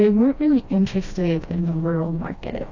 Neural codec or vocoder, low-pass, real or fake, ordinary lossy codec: codec, 16 kHz, 1 kbps, FreqCodec, smaller model; 7.2 kHz; fake; AAC, 48 kbps